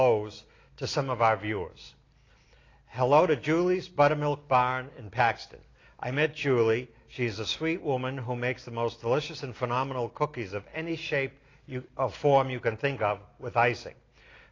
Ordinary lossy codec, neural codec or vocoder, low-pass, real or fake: AAC, 32 kbps; none; 7.2 kHz; real